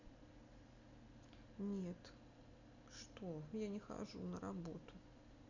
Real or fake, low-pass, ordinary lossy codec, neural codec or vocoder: real; 7.2 kHz; none; none